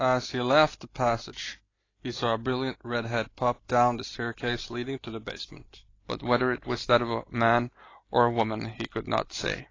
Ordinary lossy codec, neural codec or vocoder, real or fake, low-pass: AAC, 32 kbps; none; real; 7.2 kHz